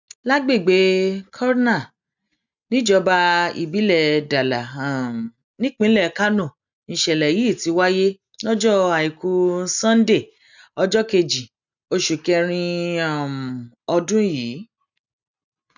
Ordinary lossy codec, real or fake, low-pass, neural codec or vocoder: none; real; 7.2 kHz; none